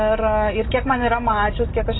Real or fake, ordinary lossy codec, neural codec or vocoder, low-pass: real; AAC, 16 kbps; none; 7.2 kHz